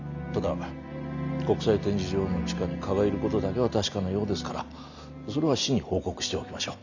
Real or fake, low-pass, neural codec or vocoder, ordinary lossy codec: real; 7.2 kHz; none; none